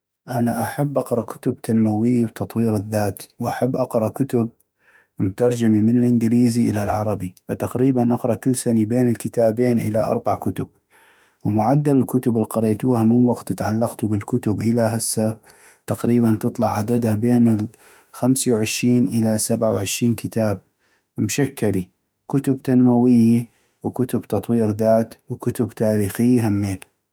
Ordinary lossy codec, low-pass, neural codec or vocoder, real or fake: none; none; autoencoder, 48 kHz, 32 numbers a frame, DAC-VAE, trained on Japanese speech; fake